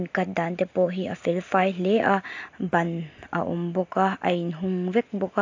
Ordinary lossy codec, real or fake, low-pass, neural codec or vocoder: AAC, 48 kbps; real; 7.2 kHz; none